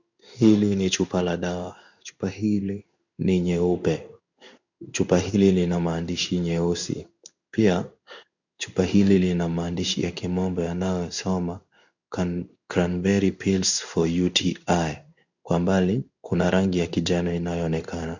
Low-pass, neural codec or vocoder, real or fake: 7.2 kHz; codec, 16 kHz in and 24 kHz out, 1 kbps, XY-Tokenizer; fake